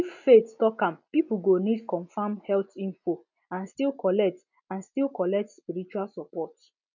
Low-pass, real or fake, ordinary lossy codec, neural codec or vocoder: 7.2 kHz; real; none; none